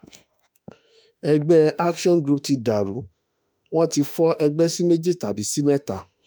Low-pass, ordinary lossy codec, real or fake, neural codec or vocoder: none; none; fake; autoencoder, 48 kHz, 32 numbers a frame, DAC-VAE, trained on Japanese speech